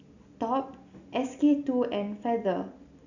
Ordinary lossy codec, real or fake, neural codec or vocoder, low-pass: none; real; none; 7.2 kHz